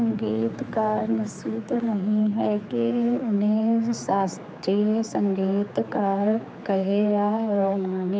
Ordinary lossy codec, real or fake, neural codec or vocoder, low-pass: none; fake; codec, 16 kHz, 4 kbps, X-Codec, HuBERT features, trained on general audio; none